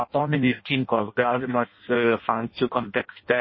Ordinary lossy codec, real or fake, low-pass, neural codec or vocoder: MP3, 24 kbps; fake; 7.2 kHz; codec, 16 kHz in and 24 kHz out, 0.6 kbps, FireRedTTS-2 codec